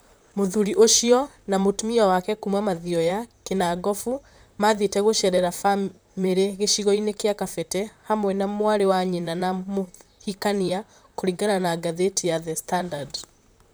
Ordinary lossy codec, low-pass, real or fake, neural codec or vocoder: none; none; fake; vocoder, 44.1 kHz, 128 mel bands, Pupu-Vocoder